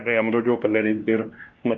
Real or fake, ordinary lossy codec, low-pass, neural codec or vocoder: fake; Opus, 24 kbps; 7.2 kHz; codec, 16 kHz, 1 kbps, X-Codec, WavLM features, trained on Multilingual LibriSpeech